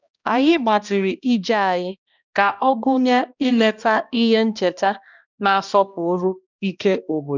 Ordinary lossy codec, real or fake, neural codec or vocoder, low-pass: none; fake; codec, 16 kHz, 1 kbps, X-Codec, HuBERT features, trained on balanced general audio; 7.2 kHz